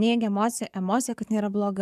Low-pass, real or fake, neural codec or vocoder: 14.4 kHz; fake; codec, 44.1 kHz, 7.8 kbps, DAC